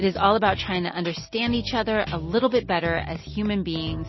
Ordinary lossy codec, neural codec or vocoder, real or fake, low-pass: MP3, 24 kbps; none; real; 7.2 kHz